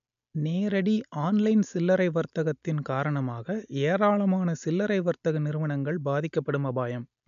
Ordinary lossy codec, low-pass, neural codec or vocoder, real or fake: none; 7.2 kHz; none; real